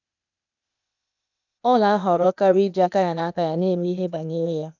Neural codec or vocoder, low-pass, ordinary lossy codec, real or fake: codec, 16 kHz, 0.8 kbps, ZipCodec; 7.2 kHz; none; fake